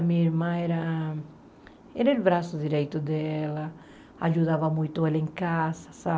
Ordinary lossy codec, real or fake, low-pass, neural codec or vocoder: none; real; none; none